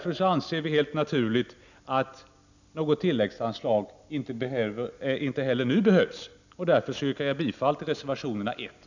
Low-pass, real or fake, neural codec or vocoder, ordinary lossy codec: 7.2 kHz; real; none; none